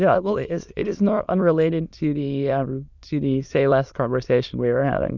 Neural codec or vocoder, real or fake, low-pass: autoencoder, 22.05 kHz, a latent of 192 numbers a frame, VITS, trained on many speakers; fake; 7.2 kHz